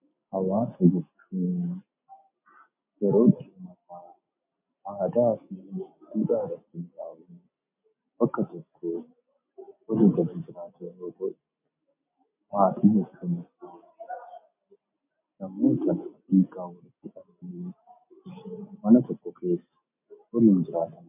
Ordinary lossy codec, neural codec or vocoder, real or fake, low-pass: MP3, 32 kbps; none; real; 3.6 kHz